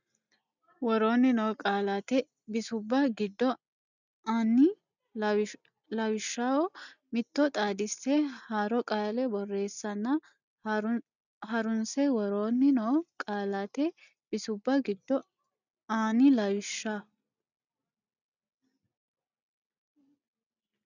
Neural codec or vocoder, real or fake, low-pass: none; real; 7.2 kHz